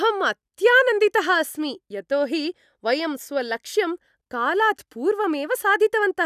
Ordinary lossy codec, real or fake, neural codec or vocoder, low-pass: none; real; none; 14.4 kHz